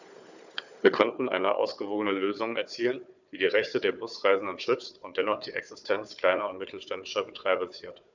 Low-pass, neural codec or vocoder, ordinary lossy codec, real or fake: 7.2 kHz; codec, 16 kHz, 4 kbps, FunCodec, trained on Chinese and English, 50 frames a second; none; fake